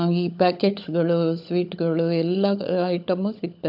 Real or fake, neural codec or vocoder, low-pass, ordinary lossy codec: fake; codec, 16 kHz, 16 kbps, FunCodec, trained on LibriTTS, 50 frames a second; 5.4 kHz; MP3, 48 kbps